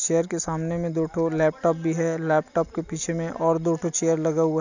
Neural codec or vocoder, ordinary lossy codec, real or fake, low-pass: none; none; real; 7.2 kHz